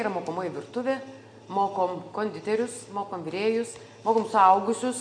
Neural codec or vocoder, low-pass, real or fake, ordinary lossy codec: none; 9.9 kHz; real; MP3, 64 kbps